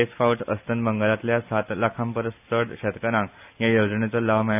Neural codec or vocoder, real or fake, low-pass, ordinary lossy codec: none; real; 3.6 kHz; none